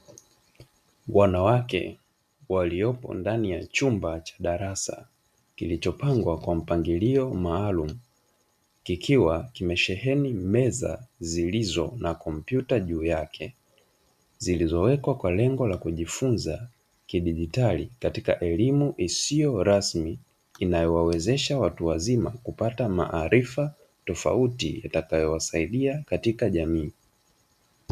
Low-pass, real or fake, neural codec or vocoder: 14.4 kHz; real; none